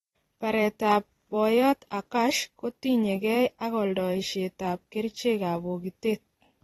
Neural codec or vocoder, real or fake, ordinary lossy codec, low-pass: vocoder, 44.1 kHz, 128 mel bands every 256 samples, BigVGAN v2; fake; AAC, 32 kbps; 19.8 kHz